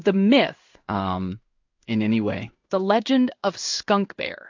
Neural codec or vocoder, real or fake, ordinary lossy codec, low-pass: codec, 16 kHz in and 24 kHz out, 1 kbps, XY-Tokenizer; fake; AAC, 48 kbps; 7.2 kHz